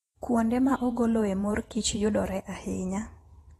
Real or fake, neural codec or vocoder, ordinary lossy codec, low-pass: fake; vocoder, 48 kHz, 128 mel bands, Vocos; AAC, 32 kbps; 19.8 kHz